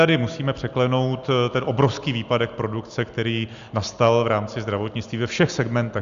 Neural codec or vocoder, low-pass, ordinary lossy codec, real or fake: none; 7.2 kHz; Opus, 64 kbps; real